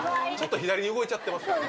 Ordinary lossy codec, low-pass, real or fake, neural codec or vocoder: none; none; real; none